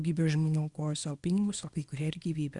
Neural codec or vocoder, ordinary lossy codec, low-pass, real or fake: codec, 24 kHz, 0.9 kbps, WavTokenizer, small release; Opus, 64 kbps; 10.8 kHz; fake